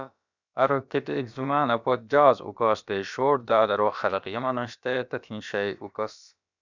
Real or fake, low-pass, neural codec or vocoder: fake; 7.2 kHz; codec, 16 kHz, about 1 kbps, DyCAST, with the encoder's durations